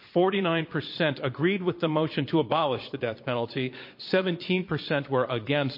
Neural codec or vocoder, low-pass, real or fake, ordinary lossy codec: vocoder, 22.05 kHz, 80 mel bands, Vocos; 5.4 kHz; fake; MP3, 32 kbps